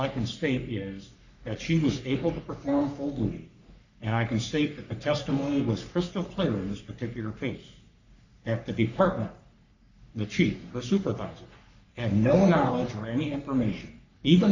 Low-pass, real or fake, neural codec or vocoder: 7.2 kHz; fake; codec, 44.1 kHz, 3.4 kbps, Pupu-Codec